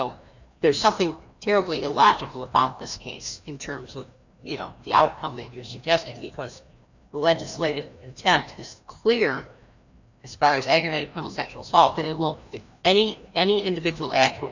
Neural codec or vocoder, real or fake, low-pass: codec, 16 kHz, 1 kbps, FreqCodec, larger model; fake; 7.2 kHz